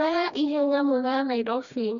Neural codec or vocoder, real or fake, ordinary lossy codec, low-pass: codec, 16 kHz, 1 kbps, FreqCodec, smaller model; fake; none; 7.2 kHz